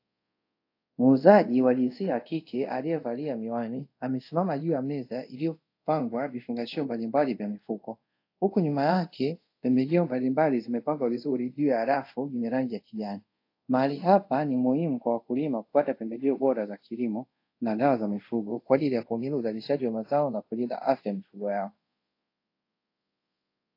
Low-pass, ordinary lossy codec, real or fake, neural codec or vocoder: 5.4 kHz; AAC, 32 kbps; fake; codec, 24 kHz, 0.5 kbps, DualCodec